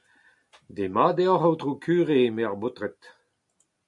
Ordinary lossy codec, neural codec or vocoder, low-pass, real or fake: MP3, 64 kbps; none; 10.8 kHz; real